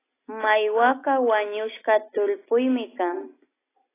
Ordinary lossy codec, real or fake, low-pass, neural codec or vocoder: AAC, 16 kbps; real; 3.6 kHz; none